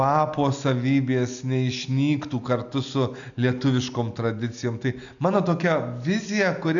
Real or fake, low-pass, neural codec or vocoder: real; 7.2 kHz; none